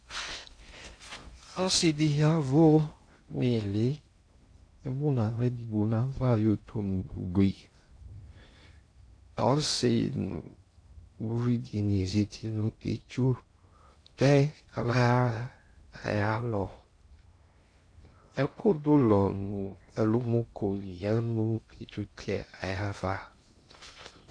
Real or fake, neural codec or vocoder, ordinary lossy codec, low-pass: fake; codec, 16 kHz in and 24 kHz out, 0.6 kbps, FocalCodec, streaming, 2048 codes; AAC, 48 kbps; 9.9 kHz